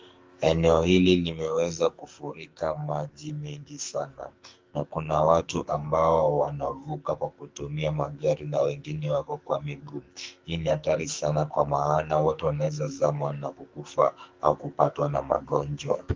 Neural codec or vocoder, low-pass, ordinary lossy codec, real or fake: codec, 44.1 kHz, 2.6 kbps, SNAC; 7.2 kHz; Opus, 32 kbps; fake